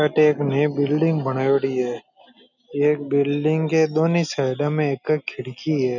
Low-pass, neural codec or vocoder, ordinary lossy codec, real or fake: 7.2 kHz; none; none; real